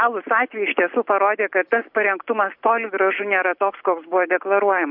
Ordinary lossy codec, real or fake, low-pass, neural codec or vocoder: MP3, 48 kbps; real; 5.4 kHz; none